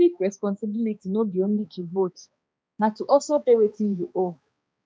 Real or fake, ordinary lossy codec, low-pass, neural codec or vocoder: fake; none; none; codec, 16 kHz, 2 kbps, X-Codec, HuBERT features, trained on balanced general audio